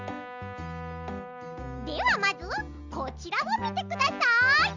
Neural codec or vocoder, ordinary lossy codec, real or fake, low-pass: none; Opus, 64 kbps; real; 7.2 kHz